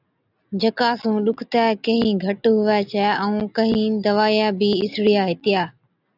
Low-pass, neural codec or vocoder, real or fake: 5.4 kHz; none; real